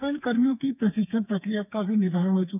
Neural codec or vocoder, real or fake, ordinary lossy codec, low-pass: codec, 32 kHz, 1.9 kbps, SNAC; fake; none; 3.6 kHz